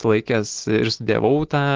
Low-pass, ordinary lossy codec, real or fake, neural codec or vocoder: 7.2 kHz; Opus, 16 kbps; real; none